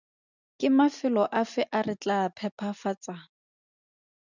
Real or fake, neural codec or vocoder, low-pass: real; none; 7.2 kHz